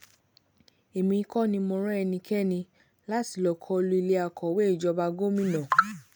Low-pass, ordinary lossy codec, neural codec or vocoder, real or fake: none; none; none; real